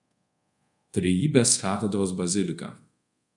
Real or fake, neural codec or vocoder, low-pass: fake; codec, 24 kHz, 0.5 kbps, DualCodec; 10.8 kHz